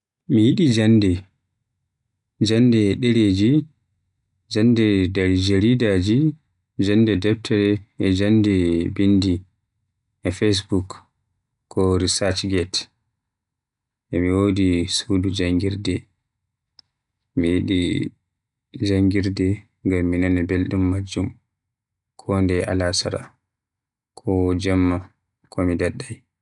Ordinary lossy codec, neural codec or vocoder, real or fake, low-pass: none; none; real; 10.8 kHz